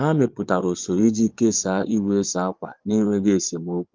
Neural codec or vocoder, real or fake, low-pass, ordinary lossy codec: vocoder, 22.05 kHz, 80 mel bands, Vocos; fake; 7.2 kHz; Opus, 32 kbps